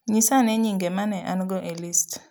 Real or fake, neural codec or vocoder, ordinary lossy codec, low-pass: real; none; none; none